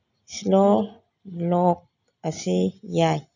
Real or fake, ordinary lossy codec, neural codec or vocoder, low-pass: real; none; none; 7.2 kHz